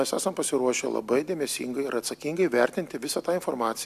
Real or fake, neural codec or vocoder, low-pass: real; none; 14.4 kHz